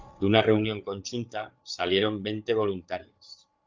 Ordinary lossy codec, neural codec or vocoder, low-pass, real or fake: Opus, 24 kbps; codec, 16 kHz, 8 kbps, FreqCodec, larger model; 7.2 kHz; fake